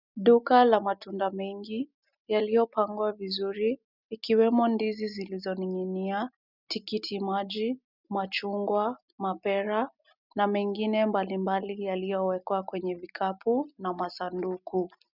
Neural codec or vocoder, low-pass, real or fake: none; 5.4 kHz; real